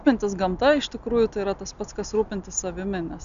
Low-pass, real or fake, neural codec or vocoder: 7.2 kHz; real; none